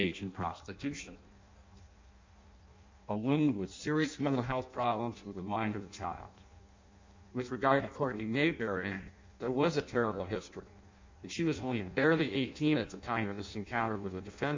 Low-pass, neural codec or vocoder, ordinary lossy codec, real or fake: 7.2 kHz; codec, 16 kHz in and 24 kHz out, 0.6 kbps, FireRedTTS-2 codec; MP3, 64 kbps; fake